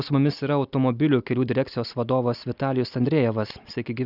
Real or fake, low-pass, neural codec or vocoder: real; 5.4 kHz; none